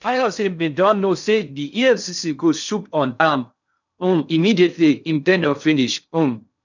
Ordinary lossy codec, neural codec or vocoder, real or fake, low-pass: none; codec, 16 kHz in and 24 kHz out, 0.6 kbps, FocalCodec, streaming, 2048 codes; fake; 7.2 kHz